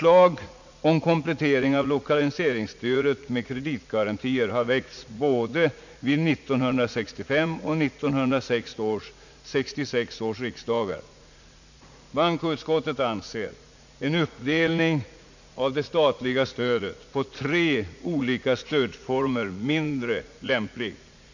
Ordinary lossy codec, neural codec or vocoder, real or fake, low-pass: none; vocoder, 44.1 kHz, 80 mel bands, Vocos; fake; 7.2 kHz